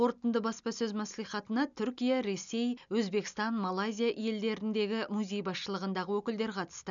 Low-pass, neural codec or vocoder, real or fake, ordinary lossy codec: 7.2 kHz; none; real; none